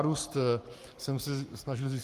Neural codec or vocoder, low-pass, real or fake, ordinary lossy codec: codec, 44.1 kHz, 7.8 kbps, Pupu-Codec; 14.4 kHz; fake; Opus, 32 kbps